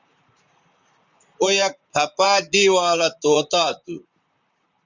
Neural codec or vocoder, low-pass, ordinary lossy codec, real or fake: vocoder, 44.1 kHz, 128 mel bands, Pupu-Vocoder; 7.2 kHz; Opus, 64 kbps; fake